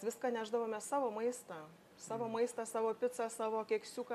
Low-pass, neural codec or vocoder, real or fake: 14.4 kHz; none; real